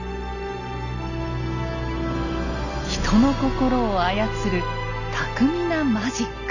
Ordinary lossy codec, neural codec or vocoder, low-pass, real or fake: none; none; 7.2 kHz; real